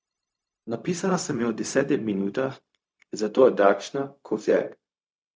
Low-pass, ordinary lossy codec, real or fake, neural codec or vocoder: none; none; fake; codec, 16 kHz, 0.4 kbps, LongCat-Audio-Codec